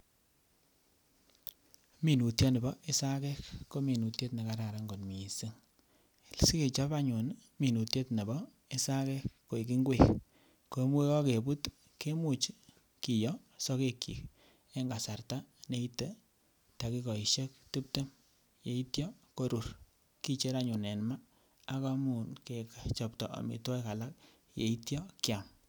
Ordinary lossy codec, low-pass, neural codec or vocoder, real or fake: none; none; none; real